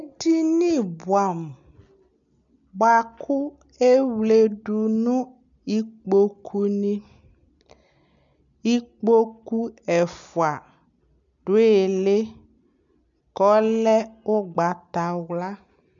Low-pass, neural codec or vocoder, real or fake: 7.2 kHz; none; real